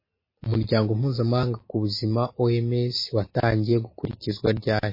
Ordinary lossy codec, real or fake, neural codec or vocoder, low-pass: MP3, 24 kbps; real; none; 5.4 kHz